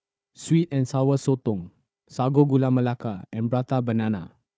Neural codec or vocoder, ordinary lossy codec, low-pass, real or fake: codec, 16 kHz, 4 kbps, FunCodec, trained on Chinese and English, 50 frames a second; none; none; fake